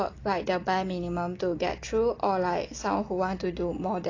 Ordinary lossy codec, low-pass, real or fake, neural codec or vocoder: AAC, 32 kbps; 7.2 kHz; real; none